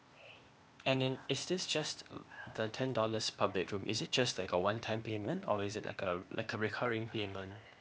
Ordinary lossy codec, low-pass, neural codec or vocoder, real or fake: none; none; codec, 16 kHz, 0.8 kbps, ZipCodec; fake